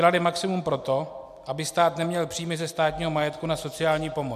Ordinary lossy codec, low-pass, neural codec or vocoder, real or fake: AAC, 96 kbps; 14.4 kHz; none; real